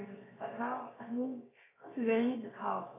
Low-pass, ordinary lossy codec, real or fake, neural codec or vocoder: 3.6 kHz; AAC, 16 kbps; fake; codec, 16 kHz, 0.7 kbps, FocalCodec